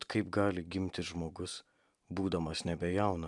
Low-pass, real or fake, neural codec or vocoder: 10.8 kHz; real; none